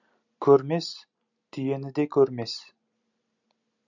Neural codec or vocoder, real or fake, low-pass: none; real; 7.2 kHz